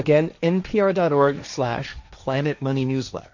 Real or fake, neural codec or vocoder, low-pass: fake; codec, 16 kHz, 1.1 kbps, Voila-Tokenizer; 7.2 kHz